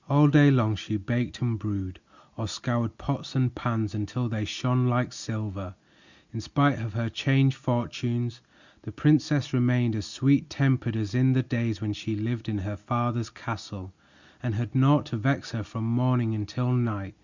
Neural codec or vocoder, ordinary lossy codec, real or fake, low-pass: none; Opus, 64 kbps; real; 7.2 kHz